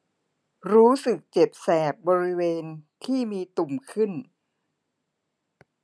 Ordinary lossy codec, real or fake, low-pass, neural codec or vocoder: none; real; none; none